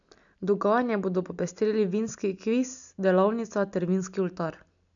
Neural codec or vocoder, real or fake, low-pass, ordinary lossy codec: none; real; 7.2 kHz; none